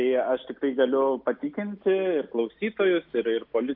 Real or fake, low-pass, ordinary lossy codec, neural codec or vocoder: real; 5.4 kHz; AAC, 48 kbps; none